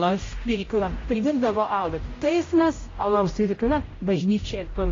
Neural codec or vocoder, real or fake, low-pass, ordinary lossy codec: codec, 16 kHz, 0.5 kbps, X-Codec, HuBERT features, trained on general audio; fake; 7.2 kHz; AAC, 32 kbps